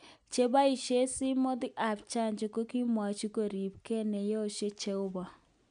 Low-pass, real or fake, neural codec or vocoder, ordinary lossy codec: 9.9 kHz; real; none; none